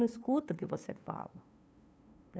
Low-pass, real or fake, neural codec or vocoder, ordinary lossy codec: none; fake; codec, 16 kHz, 2 kbps, FunCodec, trained on LibriTTS, 25 frames a second; none